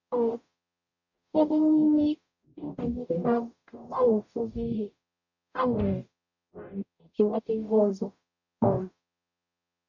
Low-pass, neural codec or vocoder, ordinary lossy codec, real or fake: 7.2 kHz; codec, 44.1 kHz, 0.9 kbps, DAC; none; fake